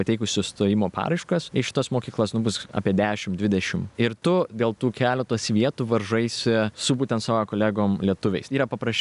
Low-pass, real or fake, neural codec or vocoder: 10.8 kHz; real; none